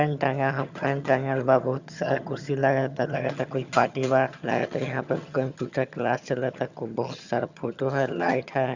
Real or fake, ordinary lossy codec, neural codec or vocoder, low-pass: fake; Opus, 64 kbps; vocoder, 22.05 kHz, 80 mel bands, HiFi-GAN; 7.2 kHz